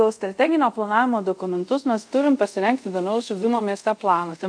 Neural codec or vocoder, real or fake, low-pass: codec, 24 kHz, 0.5 kbps, DualCodec; fake; 9.9 kHz